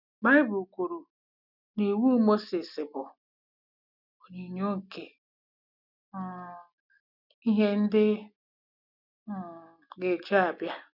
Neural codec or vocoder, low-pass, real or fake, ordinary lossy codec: none; 5.4 kHz; real; none